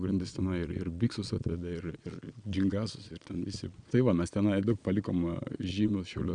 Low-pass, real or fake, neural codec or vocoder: 9.9 kHz; fake; vocoder, 22.05 kHz, 80 mel bands, Vocos